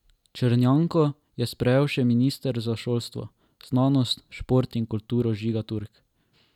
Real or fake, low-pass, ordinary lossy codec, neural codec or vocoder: real; 19.8 kHz; none; none